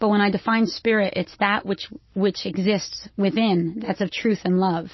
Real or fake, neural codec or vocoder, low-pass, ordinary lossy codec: real; none; 7.2 kHz; MP3, 24 kbps